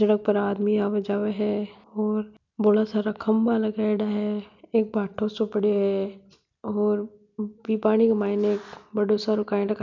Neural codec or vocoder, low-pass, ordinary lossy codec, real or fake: none; 7.2 kHz; none; real